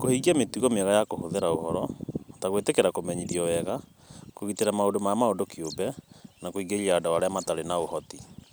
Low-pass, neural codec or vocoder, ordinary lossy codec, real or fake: none; none; none; real